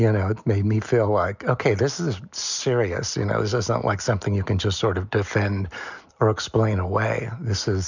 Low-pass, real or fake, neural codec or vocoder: 7.2 kHz; real; none